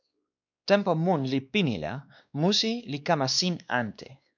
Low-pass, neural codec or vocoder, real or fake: 7.2 kHz; codec, 16 kHz, 2 kbps, X-Codec, WavLM features, trained on Multilingual LibriSpeech; fake